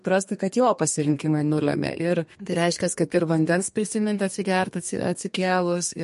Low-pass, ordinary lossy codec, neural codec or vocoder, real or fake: 14.4 kHz; MP3, 48 kbps; codec, 32 kHz, 1.9 kbps, SNAC; fake